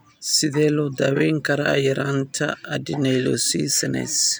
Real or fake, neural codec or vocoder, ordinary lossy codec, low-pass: real; none; none; none